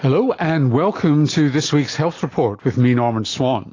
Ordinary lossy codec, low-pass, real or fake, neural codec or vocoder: AAC, 32 kbps; 7.2 kHz; real; none